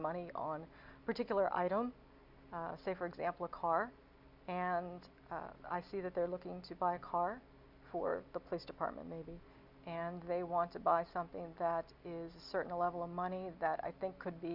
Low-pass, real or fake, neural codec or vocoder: 5.4 kHz; real; none